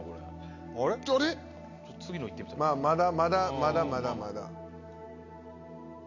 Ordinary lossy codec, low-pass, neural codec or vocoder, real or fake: none; 7.2 kHz; none; real